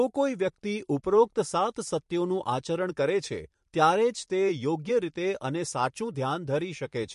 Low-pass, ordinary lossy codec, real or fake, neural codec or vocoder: 14.4 kHz; MP3, 48 kbps; fake; vocoder, 44.1 kHz, 128 mel bands every 512 samples, BigVGAN v2